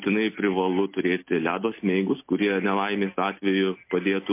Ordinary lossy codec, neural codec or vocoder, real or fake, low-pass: MP3, 24 kbps; none; real; 3.6 kHz